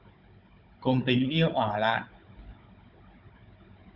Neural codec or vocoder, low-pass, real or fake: codec, 16 kHz, 16 kbps, FunCodec, trained on Chinese and English, 50 frames a second; 5.4 kHz; fake